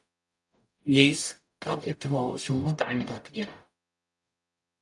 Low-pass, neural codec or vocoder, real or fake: 10.8 kHz; codec, 44.1 kHz, 0.9 kbps, DAC; fake